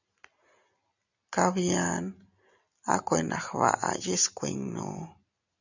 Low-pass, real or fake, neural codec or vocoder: 7.2 kHz; real; none